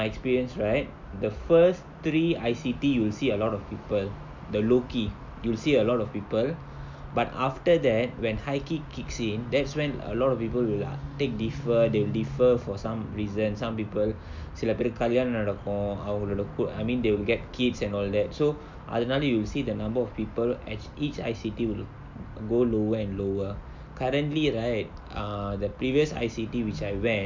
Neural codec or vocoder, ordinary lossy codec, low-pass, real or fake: none; MP3, 64 kbps; 7.2 kHz; real